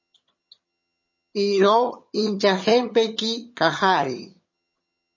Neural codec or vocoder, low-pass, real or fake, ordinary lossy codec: vocoder, 22.05 kHz, 80 mel bands, HiFi-GAN; 7.2 kHz; fake; MP3, 32 kbps